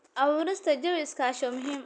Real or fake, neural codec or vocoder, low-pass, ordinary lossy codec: real; none; 9.9 kHz; none